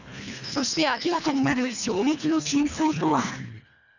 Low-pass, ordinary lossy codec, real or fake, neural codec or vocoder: 7.2 kHz; none; fake; codec, 24 kHz, 1.5 kbps, HILCodec